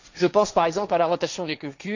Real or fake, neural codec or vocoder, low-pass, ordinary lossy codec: fake; codec, 16 kHz, 1.1 kbps, Voila-Tokenizer; 7.2 kHz; none